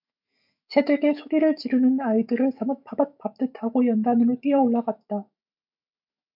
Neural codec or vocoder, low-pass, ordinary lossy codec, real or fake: autoencoder, 48 kHz, 128 numbers a frame, DAC-VAE, trained on Japanese speech; 5.4 kHz; AAC, 48 kbps; fake